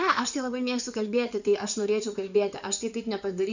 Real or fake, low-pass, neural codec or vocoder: fake; 7.2 kHz; codec, 16 kHz in and 24 kHz out, 2.2 kbps, FireRedTTS-2 codec